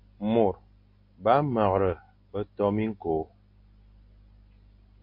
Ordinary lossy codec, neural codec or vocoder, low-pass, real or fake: MP3, 32 kbps; none; 5.4 kHz; real